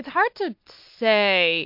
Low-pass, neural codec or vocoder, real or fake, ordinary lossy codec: 5.4 kHz; none; real; MP3, 48 kbps